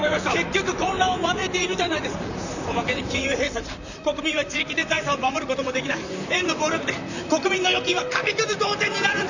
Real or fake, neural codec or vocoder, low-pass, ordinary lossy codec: fake; vocoder, 44.1 kHz, 128 mel bands, Pupu-Vocoder; 7.2 kHz; none